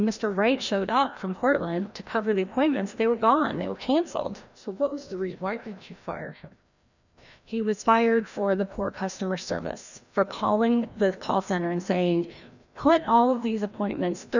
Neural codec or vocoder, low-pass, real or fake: codec, 16 kHz, 1 kbps, FreqCodec, larger model; 7.2 kHz; fake